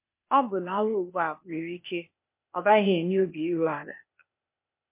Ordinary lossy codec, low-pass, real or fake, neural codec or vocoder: MP3, 24 kbps; 3.6 kHz; fake; codec, 16 kHz, 0.8 kbps, ZipCodec